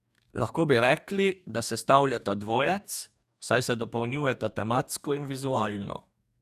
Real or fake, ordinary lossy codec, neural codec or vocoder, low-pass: fake; none; codec, 44.1 kHz, 2.6 kbps, DAC; 14.4 kHz